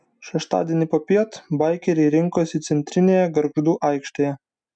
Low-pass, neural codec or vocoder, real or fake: 9.9 kHz; none; real